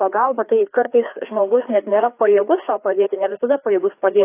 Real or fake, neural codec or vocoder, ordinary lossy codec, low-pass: fake; codec, 16 kHz, 2 kbps, FreqCodec, larger model; AAC, 24 kbps; 3.6 kHz